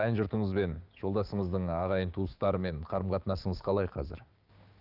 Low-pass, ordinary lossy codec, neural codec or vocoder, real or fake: 5.4 kHz; Opus, 32 kbps; codec, 16 kHz, 6 kbps, DAC; fake